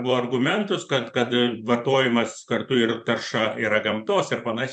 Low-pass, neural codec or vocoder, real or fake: 9.9 kHz; vocoder, 44.1 kHz, 128 mel bands, Pupu-Vocoder; fake